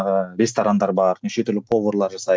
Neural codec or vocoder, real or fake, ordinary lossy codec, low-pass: none; real; none; none